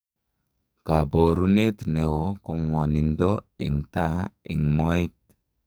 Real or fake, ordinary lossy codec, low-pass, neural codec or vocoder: fake; none; none; codec, 44.1 kHz, 2.6 kbps, SNAC